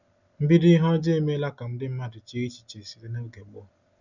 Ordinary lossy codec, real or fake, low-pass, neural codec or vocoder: none; real; 7.2 kHz; none